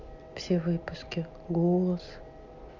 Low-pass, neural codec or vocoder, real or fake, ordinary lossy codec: 7.2 kHz; none; real; MP3, 64 kbps